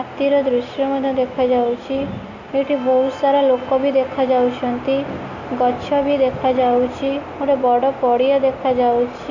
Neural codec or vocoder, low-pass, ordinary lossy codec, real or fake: none; 7.2 kHz; Opus, 64 kbps; real